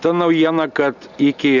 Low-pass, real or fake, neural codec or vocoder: 7.2 kHz; real; none